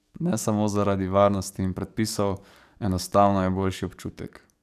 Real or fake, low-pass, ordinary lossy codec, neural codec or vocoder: fake; 14.4 kHz; none; codec, 44.1 kHz, 7.8 kbps, DAC